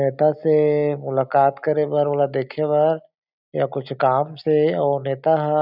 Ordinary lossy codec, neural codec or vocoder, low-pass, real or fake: none; none; 5.4 kHz; real